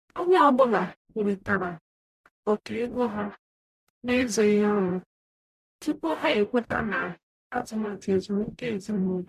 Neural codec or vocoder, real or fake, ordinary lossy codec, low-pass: codec, 44.1 kHz, 0.9 kbps, DAC; fake; MP3, 96 kbps; 14.4 kHz